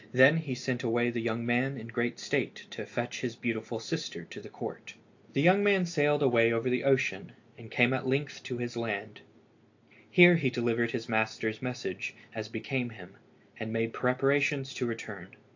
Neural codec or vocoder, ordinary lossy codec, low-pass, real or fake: none; AAC, 48 kbps; 7.2 kHz; real